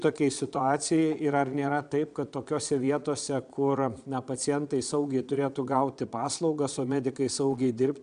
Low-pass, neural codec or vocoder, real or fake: 9.9 kHz; vocoder, 22.05 kHz, 80 mel bands, Vocos; fake